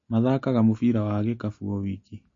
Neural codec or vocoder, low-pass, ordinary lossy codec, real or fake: none; 7.2 kHz; MP3, 32 kbps; real